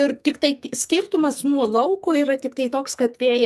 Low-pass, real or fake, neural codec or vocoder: 14.4 kHz; fake; codec, 44.1 kHz, 2.6 kbps, SNAC